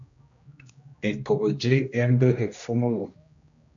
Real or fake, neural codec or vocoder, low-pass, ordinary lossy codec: fake; codec, 16 kHz, 1 kbps, X-Codec, HuBERT features, trained on general audio; 7.2 kHz; MP3, 64 kbps